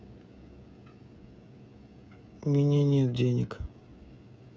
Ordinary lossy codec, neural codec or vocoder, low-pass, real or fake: none; codec, 16 kHz, 16 kbps, FreqCodec, smaller model; none; fake